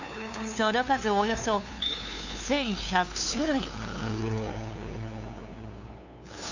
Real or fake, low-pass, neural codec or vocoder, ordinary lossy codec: fake; 7.2 kHz; codec, 16 kHz, 2 kbps, FunCodec, trained on LibriTTS, 25 frames a second; none